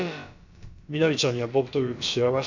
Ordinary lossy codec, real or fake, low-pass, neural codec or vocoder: MP3, 64 kbps; fake; 7.2 kHz; codec, 16 kHz, about 1 kbps, DyCAST, with the encoder's durations